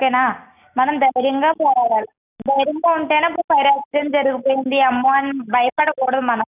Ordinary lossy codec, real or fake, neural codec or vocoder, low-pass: none; real; none; 3.6 kHz